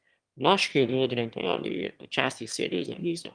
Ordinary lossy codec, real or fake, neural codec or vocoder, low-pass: Opus, 32 kbps; fake; autoencoder, 22.05 kHz, a latent of 192 numbers a frame, VITS, trained on one speaker; 9.9 kHz